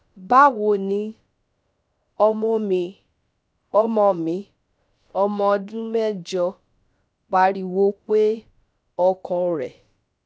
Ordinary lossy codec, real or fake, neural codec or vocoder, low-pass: none; fake; codec, 16 kHz, about 1 kbps, DyCAST, with the encoder's durations; none